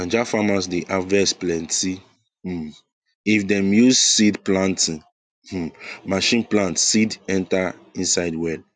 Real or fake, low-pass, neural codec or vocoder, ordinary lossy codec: real; 9.9 kHz; none; none